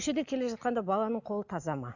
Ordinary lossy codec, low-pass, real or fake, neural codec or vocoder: none; 7.2 kHz; real; none